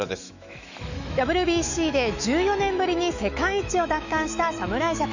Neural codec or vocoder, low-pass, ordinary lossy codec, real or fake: autoencoder, 48 kHz, 128 numbers a frame, DAC-VAE, trained on Japanese speech; 7.2 kHz; none; fake